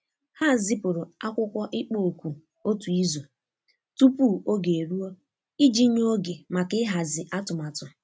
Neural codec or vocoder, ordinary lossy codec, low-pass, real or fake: none; none; none; real